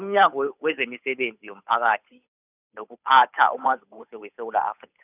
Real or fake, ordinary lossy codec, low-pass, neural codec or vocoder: fake; none; 3.6 kHz; codec, 16 kHz, 8 kbps, FunCodec, trained on Chinese and English, 25 frames a second